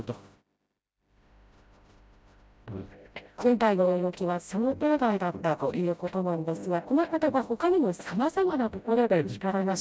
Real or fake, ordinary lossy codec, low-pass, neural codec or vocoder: fake; none; none; codec, 16 kHz, 0.5 kbps, FreqCodec, smaller model